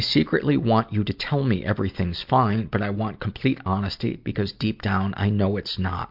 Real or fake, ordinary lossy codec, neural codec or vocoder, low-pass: real; MP3, 48 kbps; none; 5.4 kHz